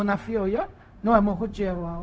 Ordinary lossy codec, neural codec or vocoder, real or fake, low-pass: none; codec, 16 kHz, 0.4 kbps, LongCat-Audio-Codec; fake; none